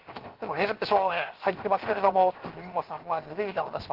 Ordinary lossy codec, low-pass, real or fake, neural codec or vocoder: Opus, 16 kbps; 5.4 kHz; fake; codec, 16 kHz, 0.7 kbps, FocalCodec